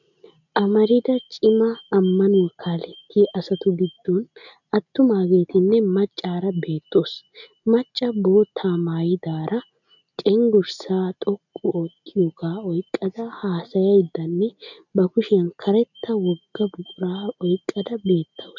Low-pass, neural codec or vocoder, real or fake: 7.2 kHz; none; real